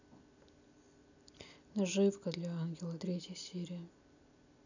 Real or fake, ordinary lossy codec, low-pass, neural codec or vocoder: real; none; 7.2 kHz; none